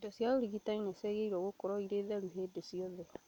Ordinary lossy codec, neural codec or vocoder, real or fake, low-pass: none; none; real; 19.8 kHz